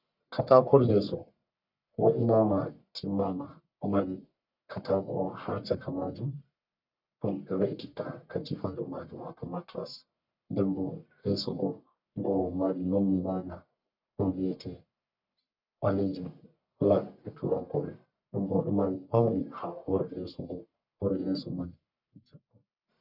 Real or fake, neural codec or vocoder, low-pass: fake; codec, 44.1 kHz, 1.7 kbps, Pupu-Codec; 5.4 kHz